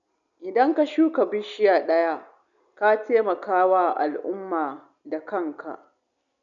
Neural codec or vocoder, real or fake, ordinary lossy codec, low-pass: none; real; none; 7.2 kHz